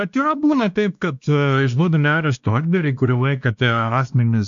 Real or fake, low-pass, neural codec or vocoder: fake; 7.2 kHz; codec, 16 kHz, 1 kbps, X-Codec, WavLM features, trained on Multilingual LibriSpeech